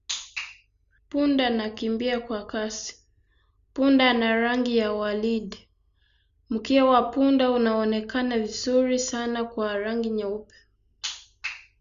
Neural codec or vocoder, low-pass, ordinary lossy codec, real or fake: none; 7.2 kHz; Opus, 64 kbps; real